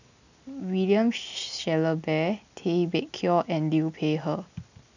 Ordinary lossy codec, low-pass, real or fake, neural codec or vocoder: none; 7.2 kHz; real; none